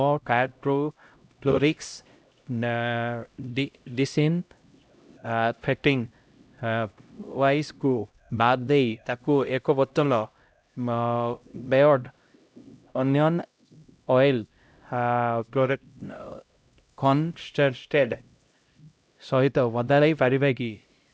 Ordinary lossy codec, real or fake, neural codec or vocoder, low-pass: none; fake; codec, 16 kHz, 0.5 kbps, X-Codec, HuBERT features, trained on LibriSpeech; none